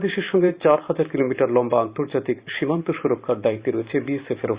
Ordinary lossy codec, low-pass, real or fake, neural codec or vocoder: Opus, 64 kbps; 3.6 kHz; fake; vocoder, 44.1 kHz, 128 mel bands every 512 samples, BigVGAN v2